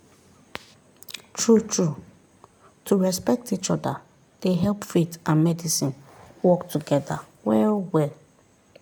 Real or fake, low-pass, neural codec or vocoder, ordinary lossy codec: real; none; none; none